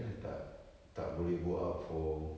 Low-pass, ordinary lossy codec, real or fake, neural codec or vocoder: none; none; real; none